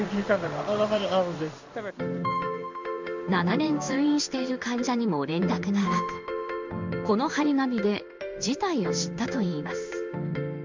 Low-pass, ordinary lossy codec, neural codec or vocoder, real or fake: 7.2 kHz; MP3, 64 kbps; codec, 16 kHz in and 24 kHz out, 1 kbps, XY-Tokenizer; fake